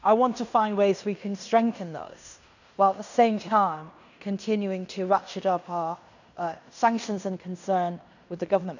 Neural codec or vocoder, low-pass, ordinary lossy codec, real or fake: codec, 16 kHz in and 24 kHz out, 0.9 kbps, LongCat-Audio-Codec, fine tuned four codebook decoder; 7.2 kHz; none; fake